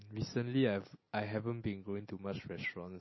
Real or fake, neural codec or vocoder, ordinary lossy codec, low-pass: real; none; MP3, 24 kbps; 7.2 kHz